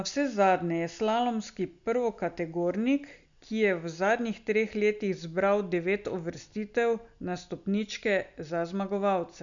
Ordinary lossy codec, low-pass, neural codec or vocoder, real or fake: none; 7.2 kHz; none; real